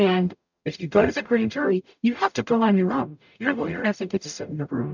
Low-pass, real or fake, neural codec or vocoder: 7.2 kHz; fake; codec, 44.1 kHz, 0.9 kbps, DAC